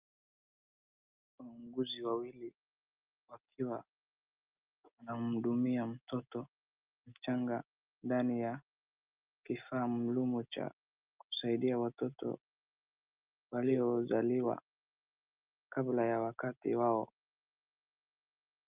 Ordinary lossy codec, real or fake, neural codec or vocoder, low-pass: Opus, 32 kbps; real; none; 3.6 kHz